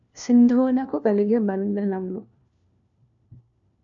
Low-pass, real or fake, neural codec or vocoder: 7.2 kHz; fake; codec, 16 kHz, 1 kbps, FunCodec, trained on LibriTTS, 50 frames a second